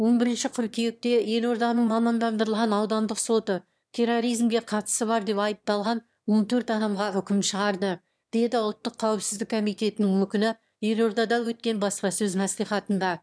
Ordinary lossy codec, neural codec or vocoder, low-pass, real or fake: none; autoencoder, 22.05 kHz, a latent of 192 numbers a frame, VITS, trained on one speaker; none; fake